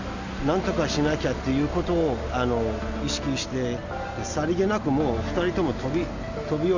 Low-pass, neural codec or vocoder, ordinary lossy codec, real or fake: 7.2 kHz; none; Opus, 64 kbps; real